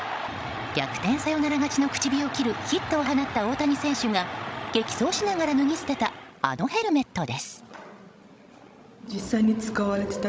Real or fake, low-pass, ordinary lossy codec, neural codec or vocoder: fake; none; none; codec, 16 kHz, 16 kbps, FreqCodec, larger model